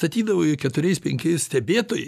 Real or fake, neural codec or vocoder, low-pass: real; none; 14.4 kHz